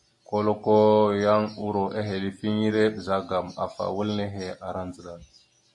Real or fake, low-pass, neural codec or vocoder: real; 10.8 kHz; none